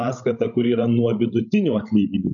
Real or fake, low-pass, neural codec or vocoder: fake; 7.2 kHz; codec, 16 kHz, 16 kbps, FreqCodec, larger model